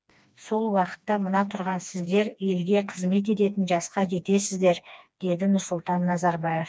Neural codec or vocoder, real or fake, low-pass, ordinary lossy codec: codec, 16 kHz, 2 kbps, FreqCodec, smaller model; fake; none; none